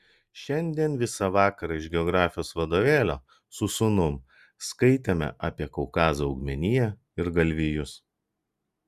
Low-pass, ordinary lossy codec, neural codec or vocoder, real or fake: 14.4 kHz; Opus, 64 kbps; none; real